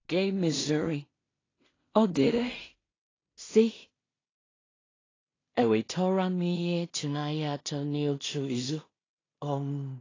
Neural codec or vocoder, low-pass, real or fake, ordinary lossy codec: codec, 16 kHz in and 24 kHz out, 0.4 kbps, LongCat-Audio-Codec, two codebook decoder; 7.2 kHz; fake; AAC, 32 kbps